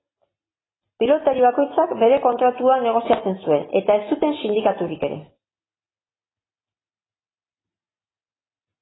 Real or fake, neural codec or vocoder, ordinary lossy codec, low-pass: real; none; AAC, 16 kbps; 7.2 kHz